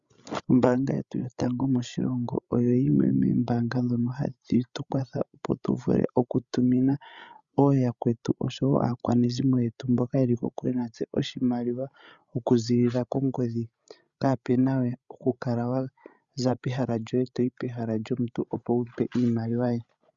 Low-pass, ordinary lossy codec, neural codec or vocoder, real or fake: 7.2 kHz; Opus, 64 kbps; codec, 16 kHz, 16 kbps, FreqCodec, larger model; fake